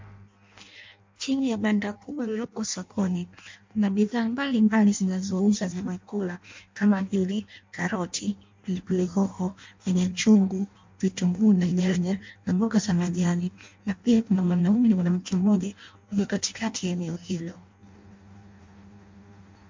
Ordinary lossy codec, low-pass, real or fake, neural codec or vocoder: MP3, 48 kbps; 7.2 kHz; fake; codec, 16 kHz in and 24 kHz out, 0.6 kbps, FireRedTTS-2 codec